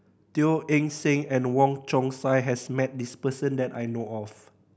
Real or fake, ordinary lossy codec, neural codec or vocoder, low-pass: real; none; none; none